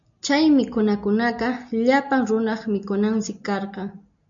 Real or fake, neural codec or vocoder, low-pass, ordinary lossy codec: real; none; 7.2 kHz; MP3, 64 kbps